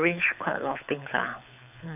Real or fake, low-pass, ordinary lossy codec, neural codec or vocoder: fake; 3.6 kHz; none; codec, 24 kHz, 6 kbps, HILCodec